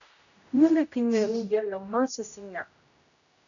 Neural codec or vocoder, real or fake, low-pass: codec, 16 kHz, 0.5 kbps, X-Codec, HuBERT features, trained on general audio; fake; 7.2 kHz